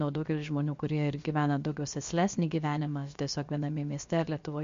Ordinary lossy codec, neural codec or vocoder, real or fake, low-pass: MP3, 48 kbps; codec, 16 kHz, about 1 kbps, DyCAST, with the encoder's durations; fake; 7.2 kHz